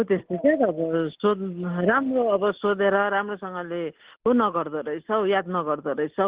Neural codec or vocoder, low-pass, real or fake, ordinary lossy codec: none; 3.6 kHz; real; Opus, 16 kbps